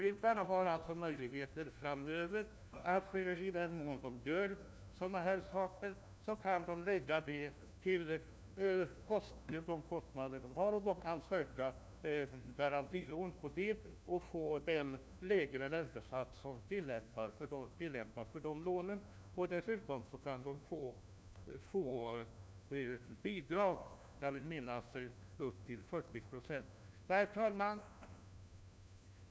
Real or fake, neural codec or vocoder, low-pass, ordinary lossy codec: fake; codec, 16 kHz, 1 kbps, FunCodec, trained on LibriTTS, 50 frames a second; none; none